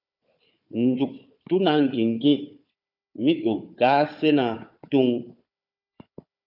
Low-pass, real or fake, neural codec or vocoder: 5.4 kHz; fake; codec, 16 kHz, 4 kbps, FunCodec, trained on Chinese and English, 50 frames a second